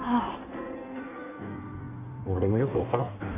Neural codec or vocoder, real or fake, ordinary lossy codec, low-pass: codec, 16 kHz in and 24 kHz out, 1.1 kbps, FireRedTTS-2 codec; fake; none; 3.6 kHz